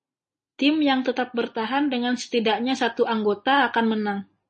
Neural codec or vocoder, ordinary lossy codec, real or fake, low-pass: none; MP3, 32 kbps; real; 10.8 kHz